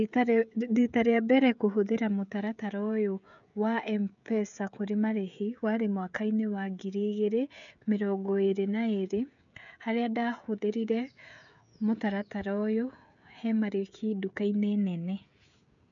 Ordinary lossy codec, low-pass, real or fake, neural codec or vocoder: none; 7.2 kHz; fake; codec, 16 kHz, 16 kbps, FreqCodec, smaller model